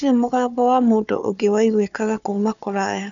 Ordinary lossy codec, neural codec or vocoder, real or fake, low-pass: none; codec, 16 kHz, 4 kbps, FunCodec, trained on Chinese and English, 50 frames a second; fake; 7.2 kHz